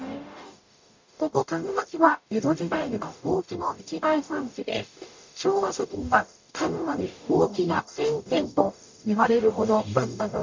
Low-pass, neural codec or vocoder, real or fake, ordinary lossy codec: 7.2 kHz; codec, 44.1 kHz, 0.9 kbps, DAC; fake; MP3, 48 kbps